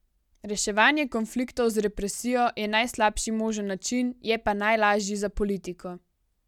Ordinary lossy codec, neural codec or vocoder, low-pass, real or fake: none; none; 19.8 kHz; real